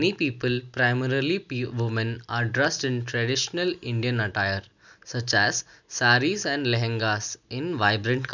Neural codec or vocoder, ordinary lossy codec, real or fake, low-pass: none; none; real; 7.2 kHz